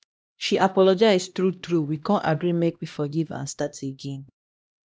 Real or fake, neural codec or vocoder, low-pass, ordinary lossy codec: fake; codec, 16 kHz, 1 kbps, X-Codec, HuBERT features, trained on LibriSpeech; none; none